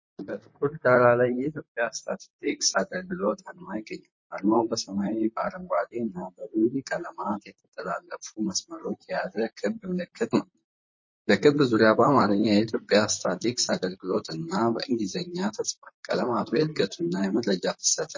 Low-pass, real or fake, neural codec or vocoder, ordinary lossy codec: 7.2 kHz; fake; vocoder, 44.1 kHz, 128 mel bands, Pupu-Vocoder; MP3, 32 kbps